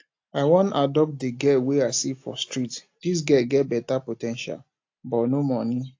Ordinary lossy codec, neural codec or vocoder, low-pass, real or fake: AAC, 48 kbps; none; 7.2 kHz; real